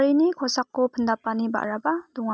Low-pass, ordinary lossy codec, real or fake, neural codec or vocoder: none; none; real; none